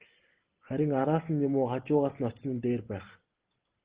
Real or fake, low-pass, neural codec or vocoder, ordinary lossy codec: real; 3.6 kHz; none; Opus, 16 kbps